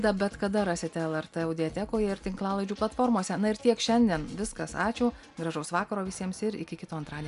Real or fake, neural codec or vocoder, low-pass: real; none; 10.8 kHz